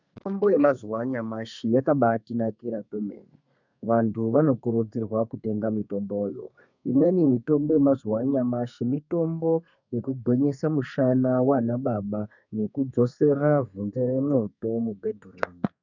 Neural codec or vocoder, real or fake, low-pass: codec, 32 kHz, 1.9 kbps, SNAC; fake; 7.2 kHz